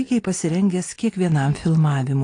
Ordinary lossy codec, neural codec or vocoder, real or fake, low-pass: AAC, 48 kbps; none; real; 9.9 kHz